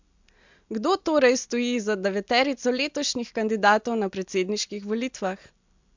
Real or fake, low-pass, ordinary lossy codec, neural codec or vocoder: real; 7.2 kHz; MP3, 64 kbps; none